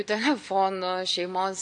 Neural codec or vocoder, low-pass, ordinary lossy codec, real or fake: none; 9.9 kHz; MP3, 96 kbps; real